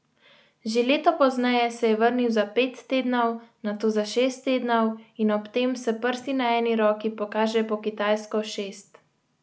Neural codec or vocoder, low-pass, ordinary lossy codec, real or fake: none; none; none; real